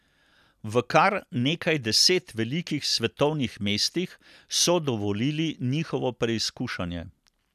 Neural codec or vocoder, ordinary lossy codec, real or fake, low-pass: none; none; real; 14.4 kHz